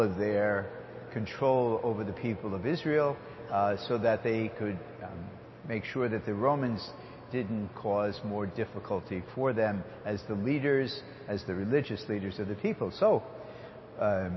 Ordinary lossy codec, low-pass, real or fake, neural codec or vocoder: MP3, 24 kbps; 7.2 kHz; real; none